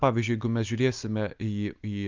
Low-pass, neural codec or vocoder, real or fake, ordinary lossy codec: 7.2 kHz; none; real; Opus, 24 kbps